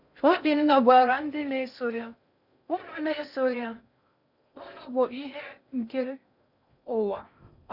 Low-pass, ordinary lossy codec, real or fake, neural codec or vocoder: 5.4 kHz; none; fake; codec, 16 kHz in and 24 kHz out, 0.6 kbps, FocalCodec, streaming, 4096 codes